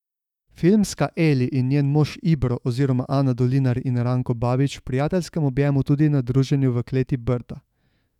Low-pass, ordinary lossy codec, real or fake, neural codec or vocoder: 19.8 kHz; none; fake; autoencoder, 48 kHz, 128 numbers a frame, DAC-VAE, trained on Japanese speech